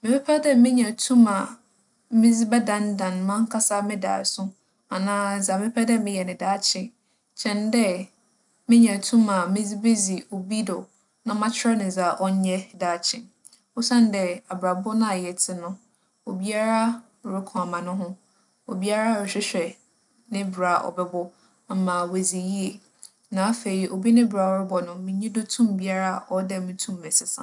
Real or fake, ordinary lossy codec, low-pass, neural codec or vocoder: real; none; 10.8 kHz; none